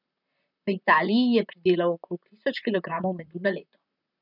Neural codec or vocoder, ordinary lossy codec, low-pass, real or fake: vocoder, 44.1 kHz, 128 mel bands, Pupu-Vocoder; none; 5.4 kHz; fake